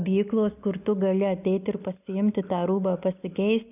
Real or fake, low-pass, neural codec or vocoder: real; 3.6 kHz; none